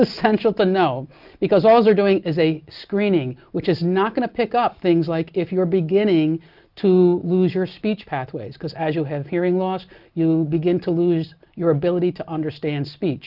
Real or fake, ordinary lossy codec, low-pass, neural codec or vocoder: real; Opus, 24 kbps; 5.4 kHz; none